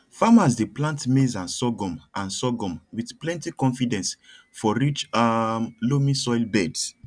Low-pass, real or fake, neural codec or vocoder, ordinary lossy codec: 9.9 kHz; real; none; none